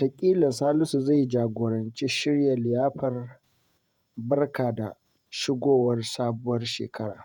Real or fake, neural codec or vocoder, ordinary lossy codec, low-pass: fake; vocoder, 48 kHz, 128 mel bands, Vocos; none; 19.8 kHz